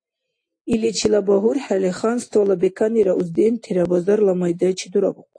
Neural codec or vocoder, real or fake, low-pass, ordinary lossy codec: none; real; 9.9 kHz; AAC, 48 kbps